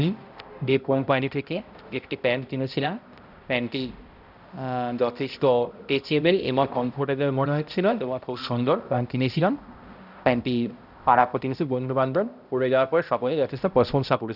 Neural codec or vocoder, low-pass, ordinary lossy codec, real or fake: codec, 16 kHz, 0.5 kbps, X-Codec, HuBERT features, trained on balanced general audio; 5.4 kHz; none; fake